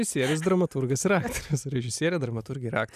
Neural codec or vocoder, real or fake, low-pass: none; real; 14.4 kHz